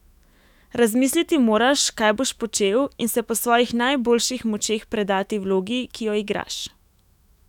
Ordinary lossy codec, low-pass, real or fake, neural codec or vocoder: none; 19.8 kHz; fake; autoencoder, 48 kHz, 128 numbers a frame, DAC-VAE, trained on Japanese speech